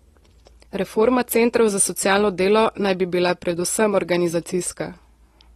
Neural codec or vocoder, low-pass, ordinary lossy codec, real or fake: none; 19.8 kHz; AAC, 32 kbps; real